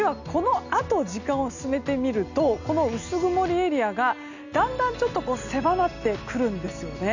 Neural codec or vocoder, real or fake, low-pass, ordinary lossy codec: none; real; 7.2 kHz; none